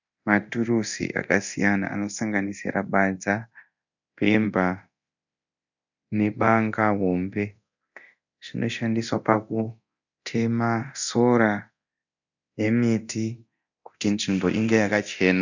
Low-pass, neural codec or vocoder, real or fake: 7.2 kHz; codec, 24 kHz, 0.9 kbps, DualCodec; fake